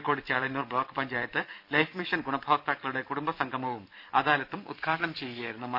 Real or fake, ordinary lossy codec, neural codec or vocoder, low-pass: fake; AAC, 48 kbps; vocoder, 44.1 kHz, 128 mel bands every 256 samples, BigVGAN v2; 5.4 kHz